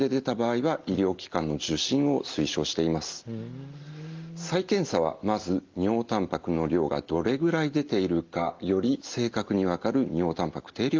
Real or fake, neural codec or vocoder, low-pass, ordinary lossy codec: real; none; 7.2 kHz; Opus, 24 kbps